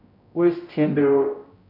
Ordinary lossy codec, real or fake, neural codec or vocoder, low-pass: none; fake; codec, 16 kHz, 0.5 kbps, X-Codec, HuBERT features, trained on general audio; 5.4 kHz